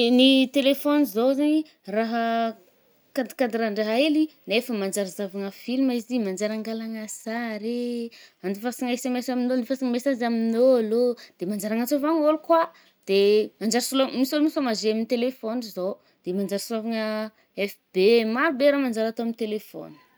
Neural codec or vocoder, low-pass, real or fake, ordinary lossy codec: none; none; real; none